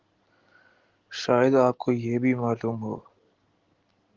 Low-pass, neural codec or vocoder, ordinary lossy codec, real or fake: 7.2 kHz; none; Opus, 16 kbps; real